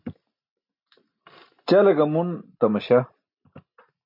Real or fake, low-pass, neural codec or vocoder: real; 5.4 kHz; none